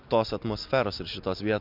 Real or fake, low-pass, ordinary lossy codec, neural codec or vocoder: real; 5.4 kHz; MP3, 48 kbps; none